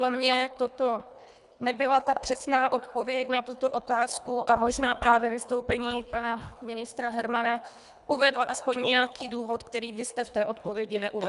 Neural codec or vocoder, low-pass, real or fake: codec, 24 kHz, 1.5 kbps, HILCodec; 10.8 kHz; fake